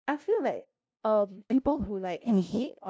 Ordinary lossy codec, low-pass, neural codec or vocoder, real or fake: none; none; codec, 16 kHz, 0.5 kbps, FunCodec, trained on LibriTTS, 25 frames a second; fake